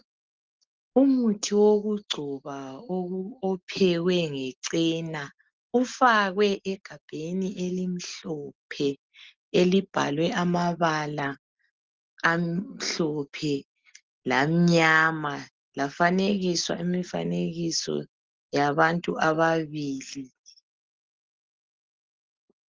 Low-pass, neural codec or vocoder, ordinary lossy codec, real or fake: 7.2 kHz; none; Opus, 16 kbps; real